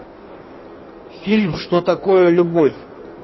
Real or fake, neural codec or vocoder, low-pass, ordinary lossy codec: fake; codec, 16 kHz in and 24 kHz out, 1.1 kbps, FireRedTTS-2 codec; 7.2 kHz; MP3, 24 kbps